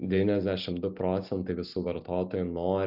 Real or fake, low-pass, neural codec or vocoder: real; 5.4 kHz; none